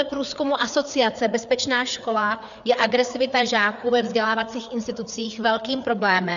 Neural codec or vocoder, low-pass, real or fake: codec, 16 kHz, 4 kbps, FreqCodec, larger model; 7.2 kHz; fake